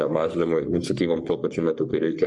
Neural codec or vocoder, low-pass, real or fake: codec, 44.1 kHz, 3.4 kbps, Pupu-Codec; 10.8 kHz; fake